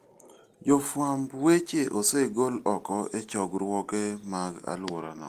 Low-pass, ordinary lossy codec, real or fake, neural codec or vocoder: 14.4 kHz; Opus, 24 kbps; real; none